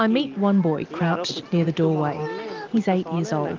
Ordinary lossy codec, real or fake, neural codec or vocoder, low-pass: Opus, 32 kbps; real; none; 7.2 kHz